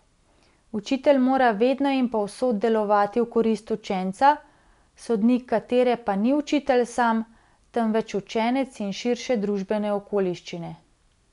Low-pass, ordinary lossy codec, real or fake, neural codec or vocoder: 10.8 kHz; none; real; none